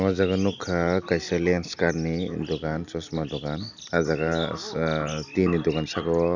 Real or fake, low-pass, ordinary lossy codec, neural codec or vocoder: real; 7.2 kHz; none; none